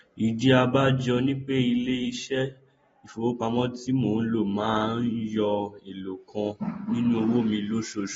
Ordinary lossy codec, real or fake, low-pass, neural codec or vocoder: AAC, 24 kbps; real; 19.8 kHz; none